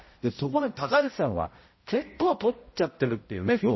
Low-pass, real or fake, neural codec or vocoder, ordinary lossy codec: 7.2 kHz; fake; codec, 16 kHz, 0.5 kbps, X-Codec, HuBERT features, trained on balanced general audio; MP3, 24 kbps